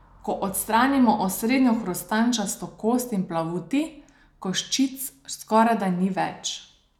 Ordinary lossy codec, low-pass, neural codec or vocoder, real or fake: none; 19.8 kHz; none; real